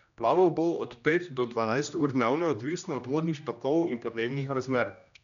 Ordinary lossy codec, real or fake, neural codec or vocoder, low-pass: none; fake; codec, 16 kHz, 1 kbps, X-Codec, HuBERT features, trained on general audio; 7.2 kHz